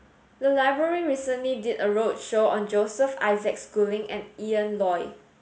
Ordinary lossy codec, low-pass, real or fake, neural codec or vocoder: none; none; real; none